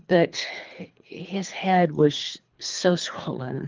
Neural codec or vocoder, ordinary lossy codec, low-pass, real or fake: codec, 24 kHz, 3 kbps, HILCodec; Opus, 24 kbps; 7.2 kHz; fake